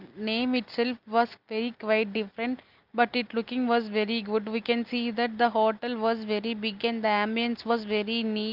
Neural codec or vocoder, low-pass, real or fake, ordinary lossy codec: none; 5.4 kHz; real; Opus, 32 kbps